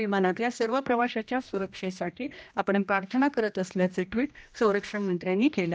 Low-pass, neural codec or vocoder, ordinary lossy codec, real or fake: none; codec, 16 kHz, 1 kbps, X-Codec, HuBERT features, trained on general audio; none; fake